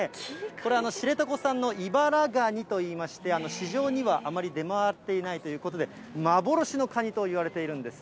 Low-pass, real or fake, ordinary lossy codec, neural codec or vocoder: none; real; none; none